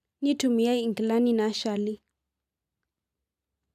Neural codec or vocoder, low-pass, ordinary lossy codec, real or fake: none; 14.4 kHz; AAC, 96 kbps; real